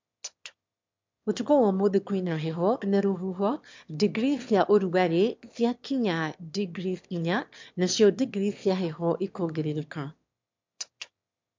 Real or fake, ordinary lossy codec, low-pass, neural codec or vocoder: fake; MP3, 64 kbps; 7.2 kHz; autoencoder, 22.05 kHz, a latent of 192 numbers a frame, VITS, trained on one speaker